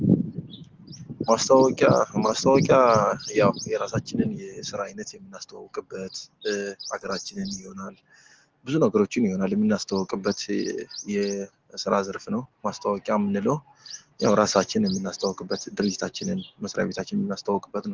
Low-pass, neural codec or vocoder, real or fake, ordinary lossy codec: 7.2 kHz; none; real; Opus, 16 kbps